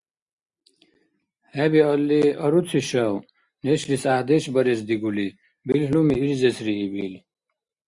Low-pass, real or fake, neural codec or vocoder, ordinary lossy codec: 10.8 kHz; real; none; Opus, 64 kbps